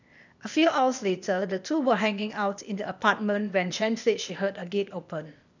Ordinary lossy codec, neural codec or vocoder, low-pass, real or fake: none; codec, 16 kHz, 0.8 kbps, ZipCodec; 7.2 kHz; fake